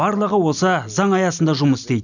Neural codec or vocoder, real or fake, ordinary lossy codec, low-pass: none; real; none; 7.2 kHz